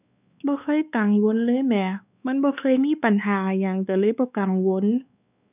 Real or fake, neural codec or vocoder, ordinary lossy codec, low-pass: fake; codec, 16 kHz, 2 kbps, X-Codec, WavLM features, trained on Multilingual LibriSpeech; none; 3.6 kHz